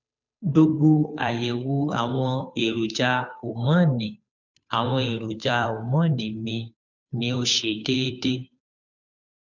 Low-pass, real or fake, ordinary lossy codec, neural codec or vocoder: 7.2 kHz; fake; none; codec, 16 kHz, 2 kbps, FunCodec, trained on Chinese and English, 25 frames a second